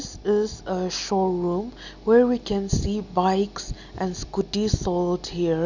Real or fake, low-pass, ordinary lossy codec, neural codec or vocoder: real; 7.2 kHz; none; none